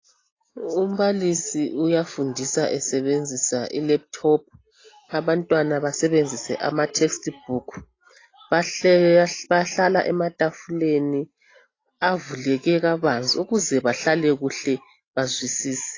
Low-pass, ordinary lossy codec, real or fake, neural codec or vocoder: 7.2 kHz; AAC, 32 kbps; real; none